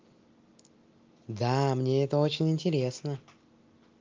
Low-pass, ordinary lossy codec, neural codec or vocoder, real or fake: 7.2 kHz; Opus, 32 kbps; none; real